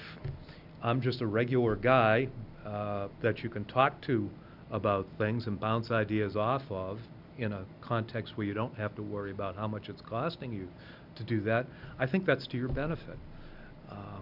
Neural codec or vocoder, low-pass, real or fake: none; 5.4 kHz; real